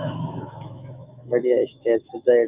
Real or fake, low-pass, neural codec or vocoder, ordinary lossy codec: fake; 3.6 kHz; autoencoder, 48 kHz, 128 numbers a frame, DAC-VAE, trained on Japanese speech; Opus, 64 kbps